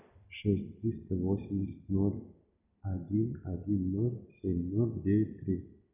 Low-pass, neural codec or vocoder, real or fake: 3.6 kHz; none; real